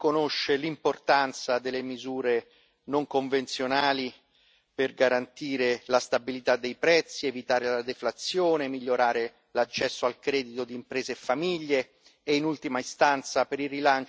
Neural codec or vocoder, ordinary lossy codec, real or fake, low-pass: none; none; real; none